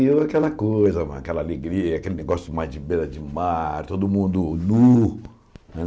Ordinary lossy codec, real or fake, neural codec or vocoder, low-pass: none; real; none; none